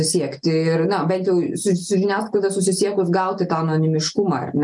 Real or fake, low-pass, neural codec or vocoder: real; 10.8 kHz; none